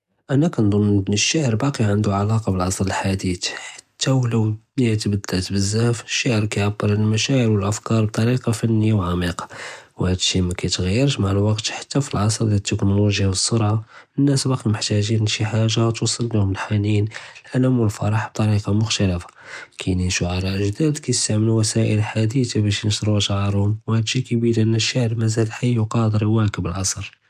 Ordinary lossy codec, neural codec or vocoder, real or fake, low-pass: none; none; real; 14.4 kHz